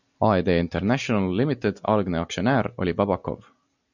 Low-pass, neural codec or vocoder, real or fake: 7.2 kHz; none; real